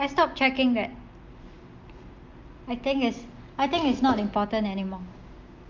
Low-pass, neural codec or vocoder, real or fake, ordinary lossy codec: 7.2 kHz; none; real; Opus, 32 kbps